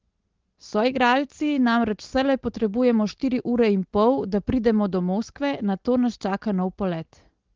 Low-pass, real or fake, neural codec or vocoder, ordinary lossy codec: 7.2 kHz; real; none; Opus, 16 kbps